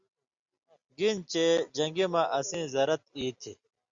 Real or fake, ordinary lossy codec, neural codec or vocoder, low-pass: real; Opus, 64 kbps; none; 7.2 kHz